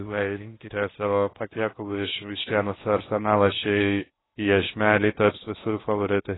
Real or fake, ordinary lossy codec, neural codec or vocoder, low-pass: fake; AAC, 16 kbps; codec, 16 kHz in and 24 kHz out, 0.6 kbps, FocalCodec, streaming, 2048 codes; 7.2 kHz